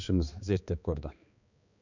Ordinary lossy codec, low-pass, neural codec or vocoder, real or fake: none; 7.2 kHz; codec, 16 kHz, 2 kbps, X-Codec, HuBERT features, trained on balanced general audio; fake